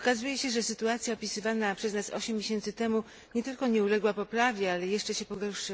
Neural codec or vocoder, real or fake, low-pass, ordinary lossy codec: none; real; none; none